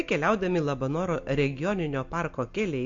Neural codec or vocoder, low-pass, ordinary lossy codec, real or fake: none; 7.2 kHz; MP3, 48 kbps; real